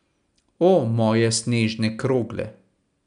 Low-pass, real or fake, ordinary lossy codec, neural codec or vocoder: 9.9 kHz; real; none; none